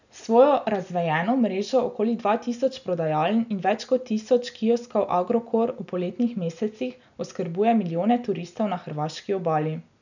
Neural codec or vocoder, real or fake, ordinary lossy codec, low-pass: none; real; none; 7.2 kHz